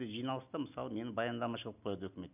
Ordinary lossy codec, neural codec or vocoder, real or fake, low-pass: none; none; real; 3.6 kHz